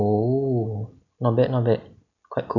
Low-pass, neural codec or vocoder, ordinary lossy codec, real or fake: 7.2 kHz; none; none; real